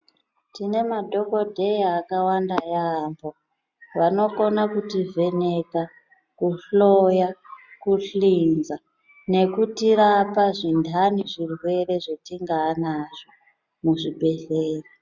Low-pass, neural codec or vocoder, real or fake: 7.2 kHz; none; real